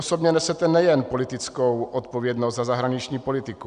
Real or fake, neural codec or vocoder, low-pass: real; none; 9.9 kHz